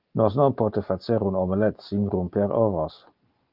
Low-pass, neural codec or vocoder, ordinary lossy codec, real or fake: 5.4 kHz; none; Opus, 32 kbps; real